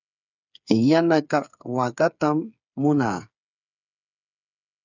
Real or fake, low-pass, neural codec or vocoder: fake; 7.2 kHz; codec, 16 kHz, 8 kbps, FreqCodec, smaller model